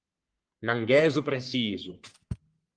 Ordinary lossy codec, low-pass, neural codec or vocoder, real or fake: Opus, 24 kbps; 9.9 kHz; codec, 44.1 kHz, 3.4 kbps, Pupu-Codec; fake